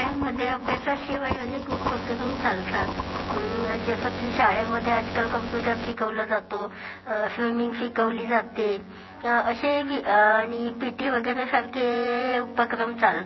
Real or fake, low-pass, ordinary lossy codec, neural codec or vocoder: fake; 7.2 kHz; MP3, 24 kbps; vocoder, 24 kHz, 100 mel bands, Vocos